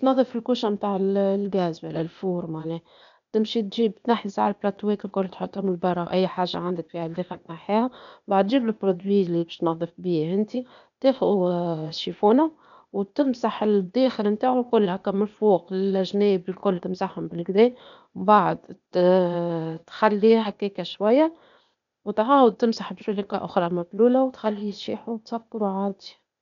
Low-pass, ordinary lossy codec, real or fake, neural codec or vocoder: 7.2 kHz; none; fake; codec, 16 kHz, 0.8 kbps, ZipCodec